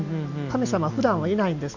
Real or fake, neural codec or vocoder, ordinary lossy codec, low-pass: real; none; none; 7.2 kHz